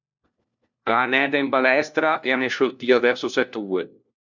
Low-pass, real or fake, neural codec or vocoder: 7.2 kHz; fake; codec, 16 kHz, 1 kbps, FunCodec, trained on LibriTTS, 50 frames a second